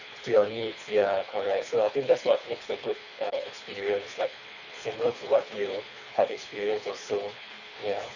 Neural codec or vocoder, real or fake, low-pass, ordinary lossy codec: codec, 24 kHz, 3 kbps, HILCodec; fake; 7.2 kHz; none